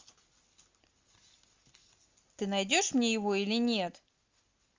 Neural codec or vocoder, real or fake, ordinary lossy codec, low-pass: none; real; Opus, 32 kbps; 7.2 kHz